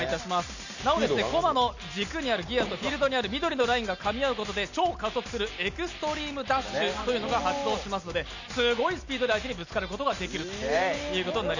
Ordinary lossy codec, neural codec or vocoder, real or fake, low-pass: none; none; real; 7.2 kHz